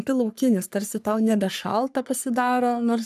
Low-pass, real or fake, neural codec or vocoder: 14.4 kHz; fake; codec, 44.1 kHz, 3.4 kbps, Pupu-Codec